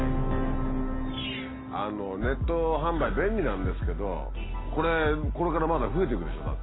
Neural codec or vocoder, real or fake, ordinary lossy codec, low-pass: none; real; AAC, 16 kbps; 7.2 kHz